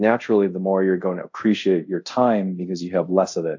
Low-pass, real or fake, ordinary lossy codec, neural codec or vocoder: 7.2 kHz; fake; AAC, 48 kbps; codec, 24 kHz, 0.5 kbps, DualCodec